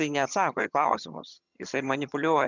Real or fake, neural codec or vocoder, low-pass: fake; vocoder, 22.05 kHz, 80 mel bands, HiFi-GAN; 7.2 kHz